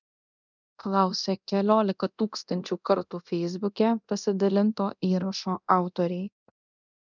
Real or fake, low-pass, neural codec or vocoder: fake; 7.2 kHz; codec, 24 kHz, 0.9 kbps, DualCodec